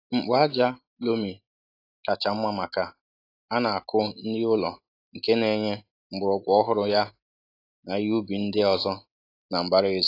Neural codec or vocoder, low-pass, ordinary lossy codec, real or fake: none; 5.4 kHz; AAC, 32 kbps; real